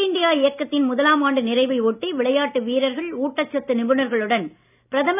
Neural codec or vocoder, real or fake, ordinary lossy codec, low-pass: none; real; none; 3.6 kHz